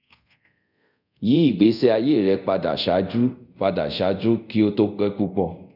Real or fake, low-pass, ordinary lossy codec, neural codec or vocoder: fake; 5.4 kHz; none; codec, 24 kHz, 0.5 kbps, DualCodec